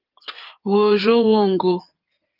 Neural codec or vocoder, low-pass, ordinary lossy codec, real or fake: codec, 16 kHz in and 24 kHz out, 2.2 kbps, FireRedTTS-2 codec; 5.4 kHz; Opus, 32 kbps; fake